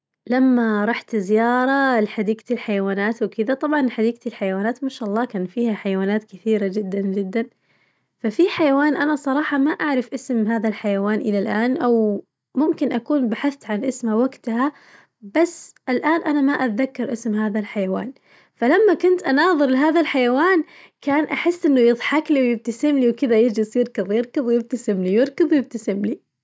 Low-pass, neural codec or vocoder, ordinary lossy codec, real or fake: none; none; none; real